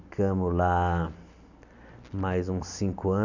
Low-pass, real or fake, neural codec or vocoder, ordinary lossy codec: 7.2 kHz; real; none; none